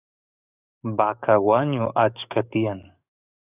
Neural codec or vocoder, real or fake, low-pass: codec, 44.1 kHz, 7.8 kbps, DAC; fake; 3.6 kHz